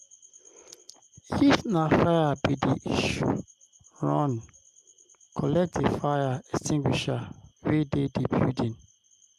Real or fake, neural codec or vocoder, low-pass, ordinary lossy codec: real; none; 14.4 kHz; Opus, 32 kbps